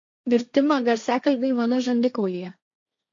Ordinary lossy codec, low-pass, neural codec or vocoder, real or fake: MP3, 48 kbps; 7.2 kHz; codec, 16 kHz, 1.1 kbps, Voila-Tokenizer; fake